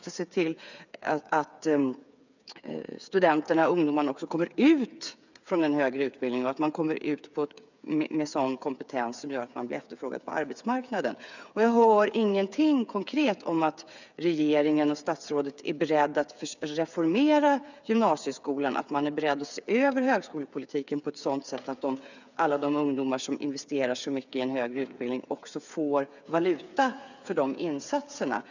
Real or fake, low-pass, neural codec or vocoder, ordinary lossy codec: fake; 7.2 kHz; codec, 16 kHz, 8 kbps, FreqCodec, smaller model; none